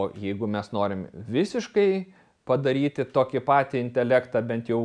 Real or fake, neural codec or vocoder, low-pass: real; none; 9.9 kHz